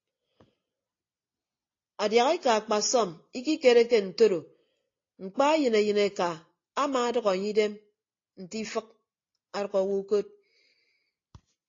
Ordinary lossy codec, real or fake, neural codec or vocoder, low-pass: AAC, 32 kbps; real; none; 7.2 kHz